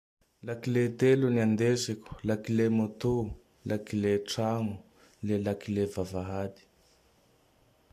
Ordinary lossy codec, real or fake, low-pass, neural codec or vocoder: AAC, 64 kbps; real; 14.4 kHz; none